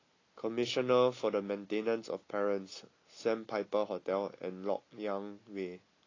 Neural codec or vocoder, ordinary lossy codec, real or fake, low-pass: none; AAC, 32 kbps; real; 7.2 kHz